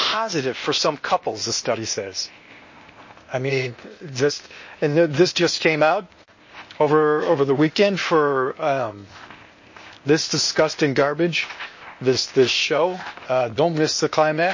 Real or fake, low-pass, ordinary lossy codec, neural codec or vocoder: fake; 7.2 kHz; MP3, 32 kbps; codec, 16 kHz, 0.8 kbps, ZipCodec